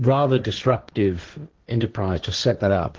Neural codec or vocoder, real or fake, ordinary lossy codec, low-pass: codec, 16 kHz, 1.1 kbps, Voila-Tokenizer; fake; Opus, 16 kbps; 7.2 kHz